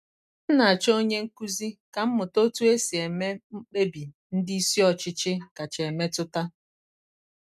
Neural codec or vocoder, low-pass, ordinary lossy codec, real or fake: none; 14.4 kHz; none; real